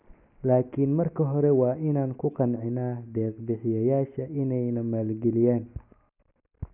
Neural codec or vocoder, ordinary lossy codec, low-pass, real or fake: none; none; 3.6 kHz; real